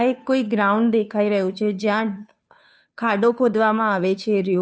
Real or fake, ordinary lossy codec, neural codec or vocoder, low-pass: fake; none; codec, 16 kHz, 2 kbps, FunCodec, trained on Chinese and English, 25 frames a second; none